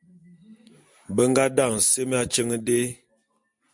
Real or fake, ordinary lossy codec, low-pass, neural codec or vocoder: real; MP3, 64 kbps; 10.8 kHz; none